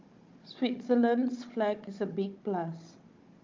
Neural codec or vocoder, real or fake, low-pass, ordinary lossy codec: codec, 16 kHz, 16 kbps, FunCodec, trained on Chinese and English, 50 frames a second; fake; 7.2 kHz; Opus, 24 kbps